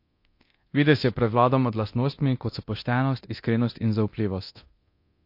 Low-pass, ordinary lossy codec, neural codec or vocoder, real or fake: 5.4 kHz; MP3, 32 kbps; codec, 24 kHz, 0.9 kbps, DualCodec; fake